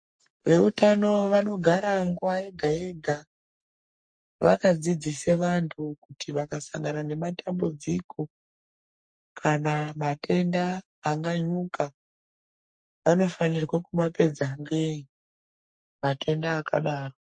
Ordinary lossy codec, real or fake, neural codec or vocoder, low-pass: MP3, 48 kbps; fake; codec, 44.1 kHz, 3.4 kbps, Pupu-Codec; 9.9 kHz